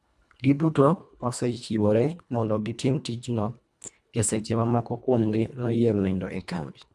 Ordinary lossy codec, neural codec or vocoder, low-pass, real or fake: none; codec, 24 kHz, 1.5 kbps, HILCodec; none; fake